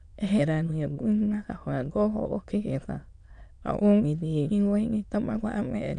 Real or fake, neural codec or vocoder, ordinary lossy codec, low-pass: fake; autoencoder, 22.05 kHz, a latent of 192 numbers a frame, VITS, trained on many speakers; AAC, 96 kbps; 9.9 kHz